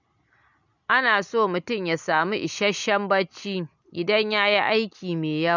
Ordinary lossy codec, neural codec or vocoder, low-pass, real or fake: none; none; 7.2 kHz; real